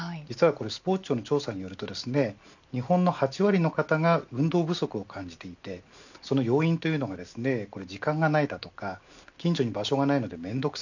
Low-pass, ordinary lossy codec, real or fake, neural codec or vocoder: 7.2 kHz; none; real; none